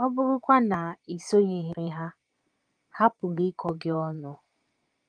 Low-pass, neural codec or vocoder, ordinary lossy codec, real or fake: 9.9 kHz; vocoder, 22.05 kHz, 80 mel bands, Vocos; Opus, 32 kbps; fake